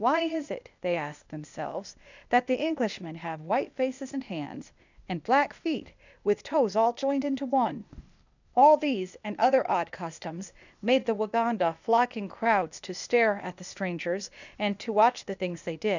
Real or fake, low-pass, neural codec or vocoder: fake; 7.2 kHz; codec, 16 kHz, 0.8 kbps, ZipCodec